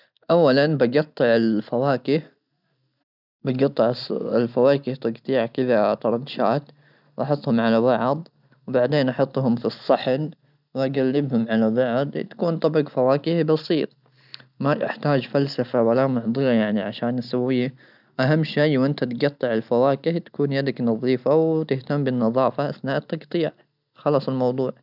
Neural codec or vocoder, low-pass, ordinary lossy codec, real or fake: none; 5.4 kHz; none; real